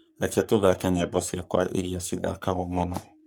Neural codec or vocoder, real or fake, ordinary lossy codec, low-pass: codec, 44.1 kHz, 3.4 kbps, Pupu-Codec; fake; none; none